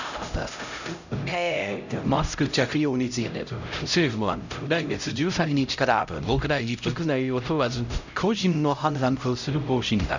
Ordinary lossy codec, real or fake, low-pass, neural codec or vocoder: none; fake; 7.2 kHz; codec, 16 kHz, 0.5 kbps, X-Codec, HuBERT features, trained on LibriSpeech